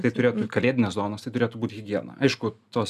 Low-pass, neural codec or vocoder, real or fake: 14.4 kHz; none; real